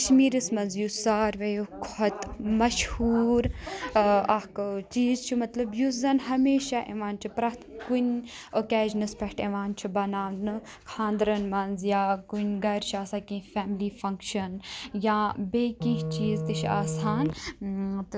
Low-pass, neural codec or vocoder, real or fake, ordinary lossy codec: none; none; real; none